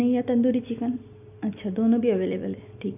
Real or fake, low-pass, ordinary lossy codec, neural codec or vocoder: real; 3.6 kHz; none; none